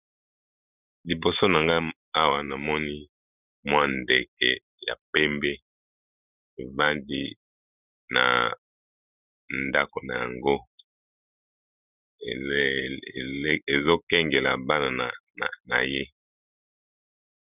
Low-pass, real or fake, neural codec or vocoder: 3.6 kHz; fake; vocoder, 44.1 kHz, 128 mel bands every 512 samples, BigVGAN v2